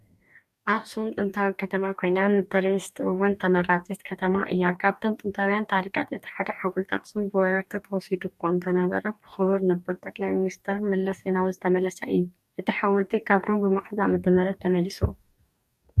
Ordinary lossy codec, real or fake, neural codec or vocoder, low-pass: MP3, 96 kbps; fake; codec, 44.1 kHz, 2.6 kbps, DAC; 14.4 kHz